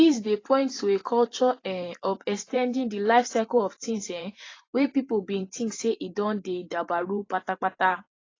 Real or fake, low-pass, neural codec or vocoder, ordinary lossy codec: fake; 7.2 kHz; vocoder, 44.1 kHz, 128 mel bands every 512 samples, BigVGAN v2; AAC, 32 kbps